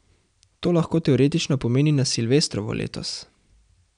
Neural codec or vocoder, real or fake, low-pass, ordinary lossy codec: none; real; 9.9 kHz; none